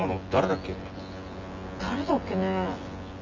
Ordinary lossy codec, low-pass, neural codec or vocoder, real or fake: Opus, 32 kbps; 7.2 kHz; vocoder, 24 kHz, 100 mel bands, Vocos; fake